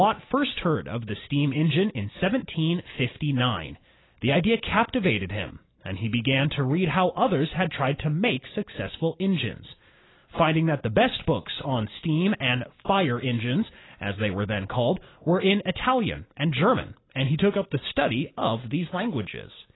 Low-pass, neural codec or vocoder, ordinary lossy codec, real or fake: 7.2 kHz; none; AAC, 16 kbps; real